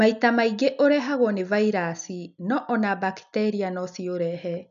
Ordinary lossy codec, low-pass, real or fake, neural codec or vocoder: none; 7.2 kHz; real; none